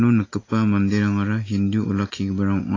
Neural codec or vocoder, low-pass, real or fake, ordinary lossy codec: none; 7.2 kHz; real; AAC, 32 kbps